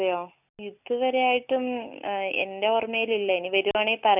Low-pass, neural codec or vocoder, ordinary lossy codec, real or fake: 3.6 kHz; none; none; real